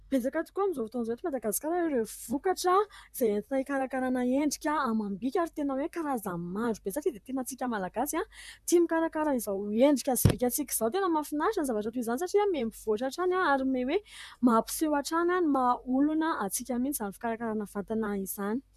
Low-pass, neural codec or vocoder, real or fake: 14.4 kHz; vocoder, 44.1 kHz, 128 mel bands, Pupu-Vocoder; fake